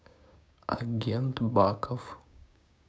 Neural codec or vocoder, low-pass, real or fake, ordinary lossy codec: codec, 16 kHz, 6 kbps, DAC; none; fake; none